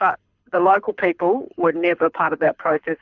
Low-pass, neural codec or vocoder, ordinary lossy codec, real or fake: 7.2 kHz; codec, 24 kHz, 6 kbps, HILCodec; Opus, 64 kbps; fake